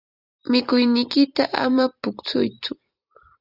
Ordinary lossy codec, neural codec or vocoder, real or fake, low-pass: Opus, 64 kbps; vocoder, 44.1 kHz, 128 mel bands every 256 samples, BigVGAN v2; fake; 5.4 kHz